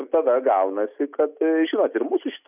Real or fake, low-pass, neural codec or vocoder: real; 3.6 kHz; none